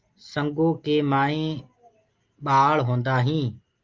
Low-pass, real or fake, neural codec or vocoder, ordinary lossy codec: 7.2 kHz; real; none; Opus, 32 kbps